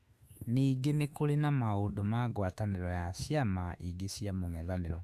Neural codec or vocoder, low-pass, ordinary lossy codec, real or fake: autoencoder, 48 kHz, 32 numbers a frame, DAC-VAE, trained on Japanese speech; 14.4 kHz; Opus, 64 kbps; fake